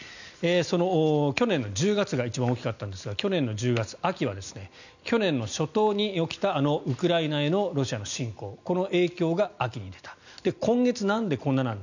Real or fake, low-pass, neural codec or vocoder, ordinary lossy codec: real; 7.2 kHz; none; none